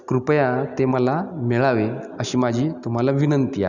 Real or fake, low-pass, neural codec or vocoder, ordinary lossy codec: real; 7.2 kHz; none; none